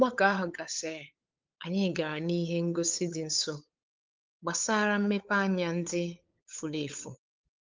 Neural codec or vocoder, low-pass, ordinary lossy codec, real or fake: codec, 16 kHz, 8 kbps, FunCodec, trained on LibriTTS, 25 frames a second; 7.2 kHz; Opus, 32 kbps; fake